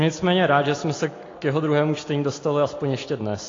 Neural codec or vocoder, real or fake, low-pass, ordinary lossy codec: none; real; 7.2 kHz; AAC, 32 kbps